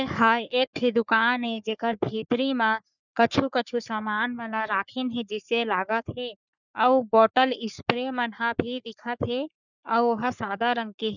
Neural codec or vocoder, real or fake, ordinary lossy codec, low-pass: codec, 44.1 kHz, 3.4 kbps, Pupu-Codec; fake; none; 7.2 kHz